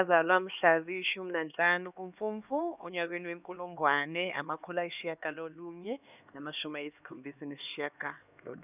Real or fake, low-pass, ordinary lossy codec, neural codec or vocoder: fake; 3.6 kHz; none; codec, 16 kHz, 2 kbps, X-Codec, HuBERT features, trained on LibriSpeech